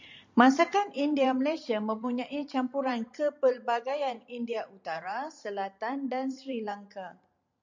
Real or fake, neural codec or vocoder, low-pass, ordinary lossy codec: fake; vocoder, 44.1 kHz, 128 mel bands every 512 samples, BigVGAN v2; 7.2 kHz; MP3, 64 kbps